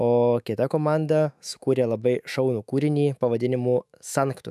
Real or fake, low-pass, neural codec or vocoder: fake; 14.4 kHz; autoencoder, 48 kHz, 128 numbers a frame, DAC-VAE, trained on Japanese speech